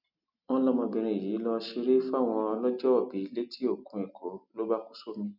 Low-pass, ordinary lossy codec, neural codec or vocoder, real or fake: 5.4 kHz; none; none; real